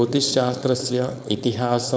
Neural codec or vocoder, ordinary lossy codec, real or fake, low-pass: codec, 16 kHz, 4.8 kbps, FACodec; none; fake; none